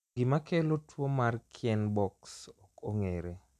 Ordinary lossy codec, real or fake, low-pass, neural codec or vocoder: none; real; 14.4 kHz; none